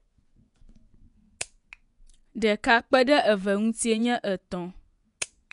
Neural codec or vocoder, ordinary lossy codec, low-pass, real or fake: vocoder, 24 kHz, 100 mel bands, Vocos; none; 10.8 kHz; fake